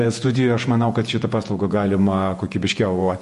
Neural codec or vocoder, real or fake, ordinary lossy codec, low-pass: vocoder, 48 kHz, 128 mel bands, Vocos; fake; MP3, 48 kbps; 14.4 kHz